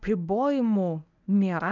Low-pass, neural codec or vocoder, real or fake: 7.2 kHz; codec, 24 kHz, 0.9 kbps, WavTokenizer, medium speech release version 1; fake